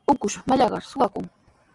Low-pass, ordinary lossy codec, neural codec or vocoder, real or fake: 10.8 kHz; Opus, 64 kbps; none; real